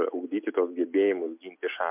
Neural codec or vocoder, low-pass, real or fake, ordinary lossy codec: none; 3.6 kHz; real; MP3, 32 kbps